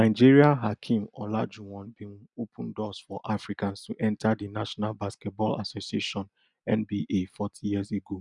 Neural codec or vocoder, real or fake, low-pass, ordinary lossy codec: none; real; 10.8 kHz; none